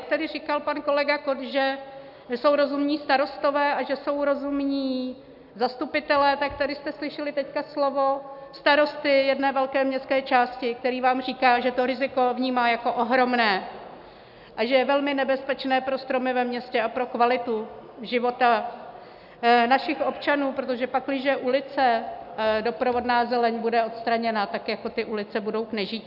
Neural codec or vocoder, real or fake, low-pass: none; real; 5.4 kHz